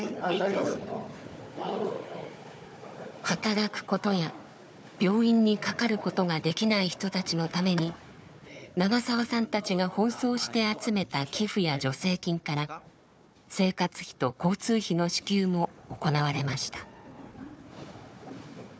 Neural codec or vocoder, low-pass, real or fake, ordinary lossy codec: codec, 16 kHz, 4 kbps, FunCodec, trained on Chinese and English, 50 frames a second; none; fake; none